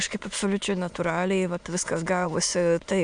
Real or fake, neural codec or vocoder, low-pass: fake; autoencoder, 22.05 kHz, a latent of 192 numbers a frame, VITS, trained on many speakers; 9.9 kHz